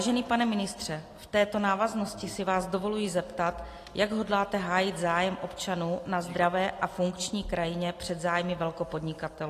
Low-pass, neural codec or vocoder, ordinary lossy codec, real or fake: 14.4 kHz; none; AAC, 48 kbps; real